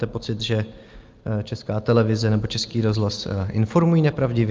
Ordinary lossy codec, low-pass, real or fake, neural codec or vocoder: Opus, 24 kbps; 7.2 kHz; real; none